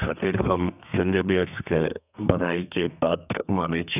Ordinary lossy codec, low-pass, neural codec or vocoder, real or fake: none; 3.6 kHz; codec, 32 kHz, 1.9 kbps, SNAC; fake